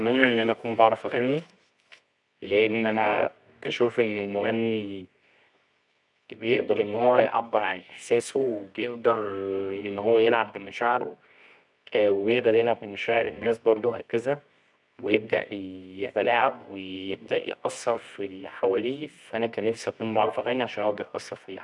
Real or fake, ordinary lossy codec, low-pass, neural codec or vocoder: fake; none; 10.8 kHz; codec, 24 kHz, 0.9 kbps, WavTokenizer, medium music audio release